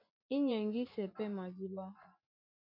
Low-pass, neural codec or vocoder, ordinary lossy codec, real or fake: 5.4 kHz; vocoder, 24 kHz, 100 mel bands, Vocos; MP3, 48 kbps; fake